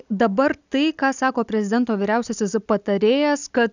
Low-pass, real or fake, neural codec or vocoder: 7.2 kHz; real; none